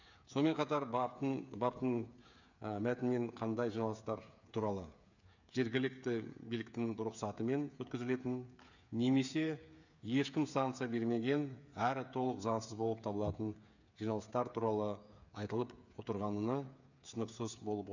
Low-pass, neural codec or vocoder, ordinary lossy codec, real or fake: 7.2 kHz; codec, 16 kHz, 8 kbps, FreqCodec, smaller model; none; fake